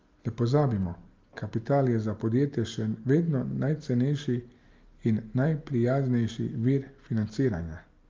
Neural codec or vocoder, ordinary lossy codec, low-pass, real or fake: none; Opus, 32 kbps; 7.2 kHz; real